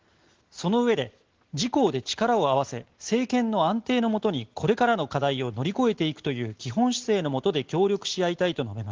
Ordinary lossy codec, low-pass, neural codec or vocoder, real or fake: Opus, 16 kbps; 7.2 kHz; none; real